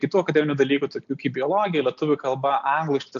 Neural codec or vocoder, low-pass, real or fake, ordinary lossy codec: none; 7.2 kHz; real; AAC, 64 kbps